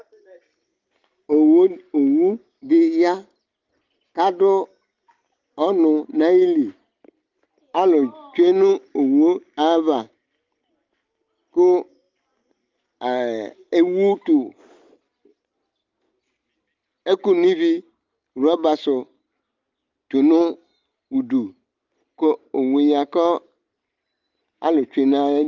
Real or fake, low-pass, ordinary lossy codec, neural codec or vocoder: real; 7.2 kHz; Opus, 32 kbps; none